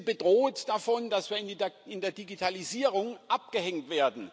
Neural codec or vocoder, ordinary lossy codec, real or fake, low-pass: none; none; real; none